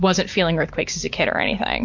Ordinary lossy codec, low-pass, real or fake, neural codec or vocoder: MP3, 48 kbps; 7.2 kHz; real; none